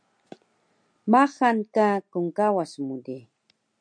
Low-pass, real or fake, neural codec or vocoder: 9.9 kHz; real; none